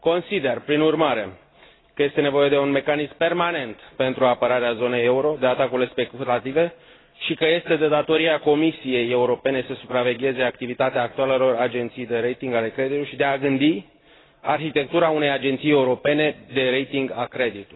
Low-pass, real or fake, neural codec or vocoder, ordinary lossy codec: 7.2 kHz; real; none; AAC, 16 kbps